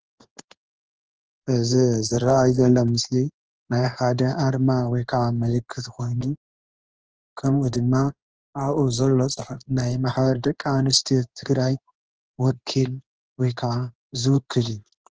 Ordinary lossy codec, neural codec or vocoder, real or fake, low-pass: Opus, 16 kbps; codec, 16 kHz in and 24 kHz out, 1 kbps, XY-Tokenizer; fake; 7.2 kHz